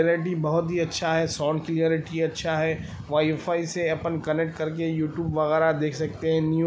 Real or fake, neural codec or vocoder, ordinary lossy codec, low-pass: real; none; none; none